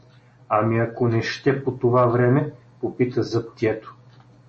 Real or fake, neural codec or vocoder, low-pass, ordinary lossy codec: real; none; 10.8 kHz; MP3, 32 kbps